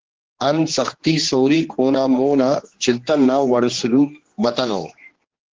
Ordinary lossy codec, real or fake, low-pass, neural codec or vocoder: Opus, 16 kbps; fake; 7.2 kHz; codec, 16 kHz, 2 kbps, X-Codec, HuBERT features, trained on general audio